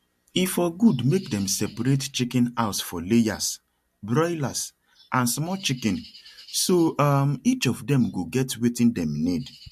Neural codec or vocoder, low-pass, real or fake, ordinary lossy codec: none; 14.4 kHz; real; MP3, 64 kbps